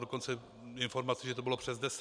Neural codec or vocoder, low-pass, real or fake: none; 9.9 kHz; real